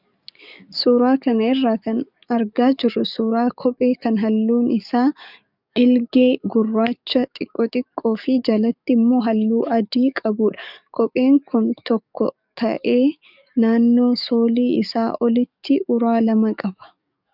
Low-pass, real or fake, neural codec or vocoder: 5.4 kHz; fake; codec, 44.1 kHz, 7.8 kbps, DAC